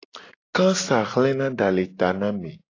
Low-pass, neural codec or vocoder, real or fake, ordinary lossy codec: 7.2 kHz; none; real; AAC, 32 kbps